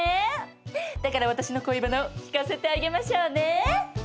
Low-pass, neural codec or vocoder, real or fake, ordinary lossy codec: none; none; real; none